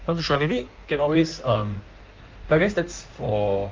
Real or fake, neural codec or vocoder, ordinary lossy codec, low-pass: fake; codec, 16 kHz in and 24 kHz out, 1.1 kbps, FireRedTTS-2 codec; Opus, 32 kbps; 7.2 kHz